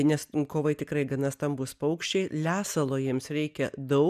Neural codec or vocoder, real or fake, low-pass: none; real; 14.4 kHz